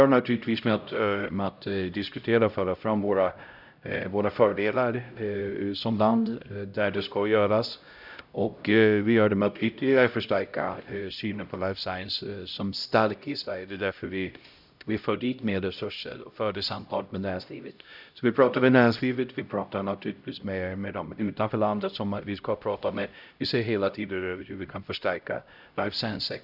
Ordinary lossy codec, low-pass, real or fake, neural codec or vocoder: none; 5.4 kHz; fake; codec, 16 kHz, 0.5 kbps, X-Codec, HuBERT features, trained on LibriSpeech